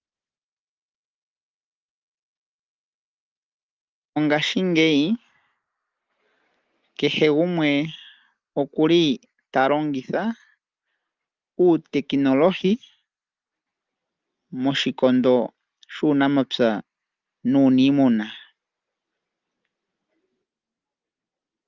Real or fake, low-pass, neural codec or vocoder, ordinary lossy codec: real; 7.2 kHz; none; Opus, 32 kbps